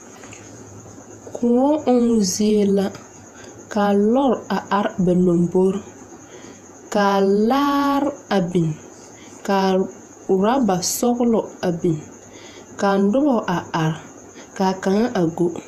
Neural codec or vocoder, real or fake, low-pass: vocoder, 48 kHz, 128 mel bands, Vocos; fake; 14.4 kHz